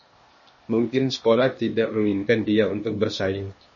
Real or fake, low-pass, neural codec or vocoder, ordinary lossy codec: fake; 7.2 kHz; codec, 16 kHz, 0.8 kbps, ZipCodec; MP3, 32 kbps